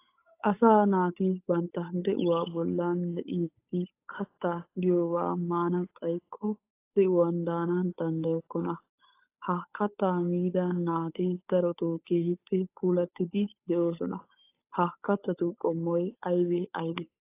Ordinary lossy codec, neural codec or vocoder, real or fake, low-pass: AAC, 24 kbps; codec, 16 kHz, 8 kbps, FunCodec, trained on Chinese and English, 25 frames a second; fake; 3.6 kHz